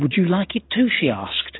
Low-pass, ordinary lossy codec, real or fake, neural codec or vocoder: 7.2 kHz; AAC, 16 kbps; real; none